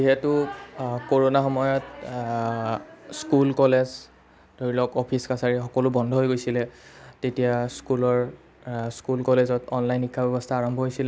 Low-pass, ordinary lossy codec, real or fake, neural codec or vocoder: none; none; real; none